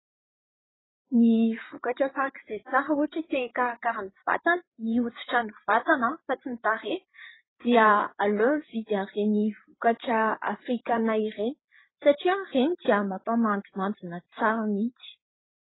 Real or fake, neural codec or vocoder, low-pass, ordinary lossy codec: fake; codec, 16 kHz, 8 kbps, FreqCodec, larger model; 7.2 kHz; AAC, 16 kbps